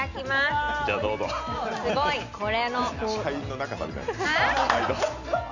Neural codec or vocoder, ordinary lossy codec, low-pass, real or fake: none; none; 7.2 kHz; real